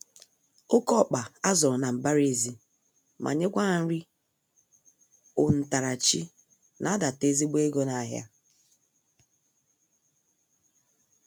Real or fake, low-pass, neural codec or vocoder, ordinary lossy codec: real; none; none; none